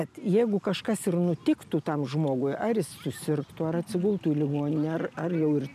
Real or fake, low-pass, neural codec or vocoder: real; 14.4 kHz; none